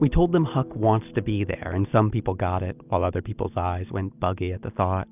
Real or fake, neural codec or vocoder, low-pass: real; none; 3.6 kHz